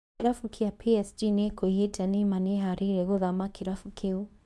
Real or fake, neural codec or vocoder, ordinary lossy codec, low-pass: fake; codec, 24 kHz, 0.9 kbps, WavTokenizer, medium speech release version 2; none; none